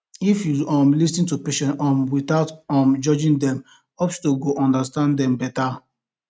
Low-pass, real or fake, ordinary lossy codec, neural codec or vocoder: none; real; none; none